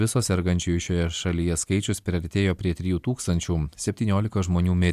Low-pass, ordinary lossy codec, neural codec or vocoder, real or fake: 14.4 kHz; AAC, 96 kbps; none; real